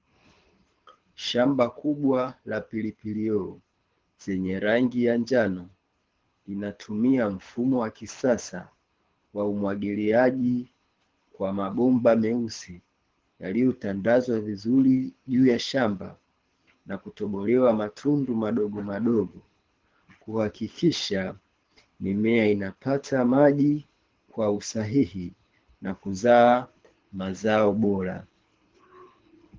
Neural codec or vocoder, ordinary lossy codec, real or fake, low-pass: codec, 24 kHz, 6 kbps, HILCodec; Opus, 16 kbps; fake; 7.2 kHz